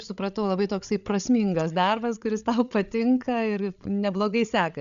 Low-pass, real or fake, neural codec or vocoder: 7.2 kHz; fake; codec, 16 kHz, 8 kbps, FreqCodec, larger model